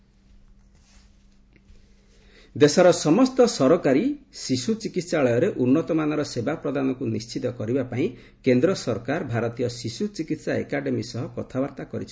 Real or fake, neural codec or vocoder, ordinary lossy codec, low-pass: real; none; none; none